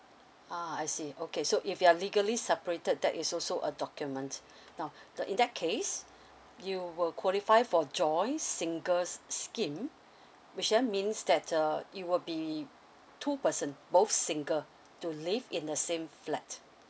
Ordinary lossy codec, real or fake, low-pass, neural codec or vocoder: none; real; none; none